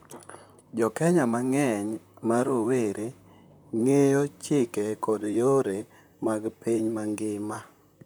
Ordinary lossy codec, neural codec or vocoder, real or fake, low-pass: none; vocoder, 44.1 kHz, 128 mel bands, Pupu-Vocoder; fake; none